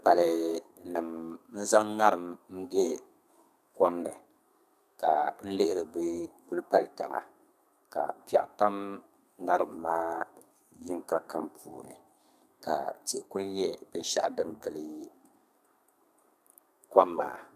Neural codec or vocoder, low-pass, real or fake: codec, 32 kHz, 1.9 kbps, SNAC; 14.4 kHz; fake